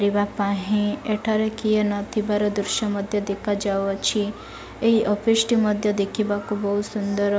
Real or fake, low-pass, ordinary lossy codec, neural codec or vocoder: real; none; none; none